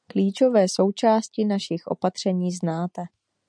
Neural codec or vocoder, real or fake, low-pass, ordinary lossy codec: none; real; 9.9 kHz; MP3, 96 kbps